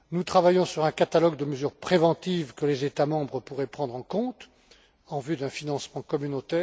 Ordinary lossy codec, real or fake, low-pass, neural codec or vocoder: none; real; none; none